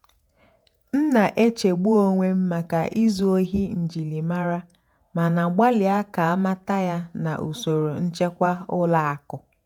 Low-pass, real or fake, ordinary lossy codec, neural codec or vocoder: 19.8 kHz; fake; MP3, 96 kbps; vocoder, 48 kHz, 128 mel bands, Vocos